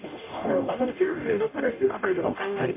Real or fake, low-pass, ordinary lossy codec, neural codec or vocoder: fake; 3.6 kHz; MP3, 24 kbps; codec, 44.1 kHz, 0.9 kbps, DAC